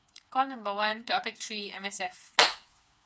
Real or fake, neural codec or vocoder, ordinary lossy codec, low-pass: fake; codec, 16 kHz, 4 kbps, FreqCodec, smaller model; none; none